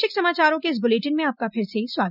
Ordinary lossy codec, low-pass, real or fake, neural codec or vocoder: none; 5.4 kHz; real; none